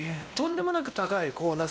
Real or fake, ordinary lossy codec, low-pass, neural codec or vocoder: fake; none; none; codec, 16 kHz, 1 kbps, X-Codec, WavLM features, trained on Multilingual LibriSpeech